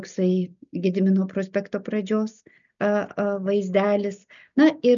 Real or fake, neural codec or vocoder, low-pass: real; none; 7.2 kHz